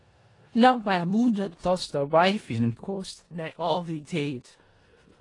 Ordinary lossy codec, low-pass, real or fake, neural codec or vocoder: AAC, 32 kbps; 10.8 kHz; fake; codec, 16 kHz in and 24 kHz out, 0.4 kbps, LongCat-Audio-Codec, four codebook decoder